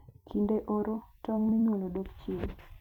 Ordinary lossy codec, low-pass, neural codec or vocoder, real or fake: none; 19.8 kHz; vocoder, 44.1 kHz, 128 mel bands every 256 samples, BigVGAN v2; fake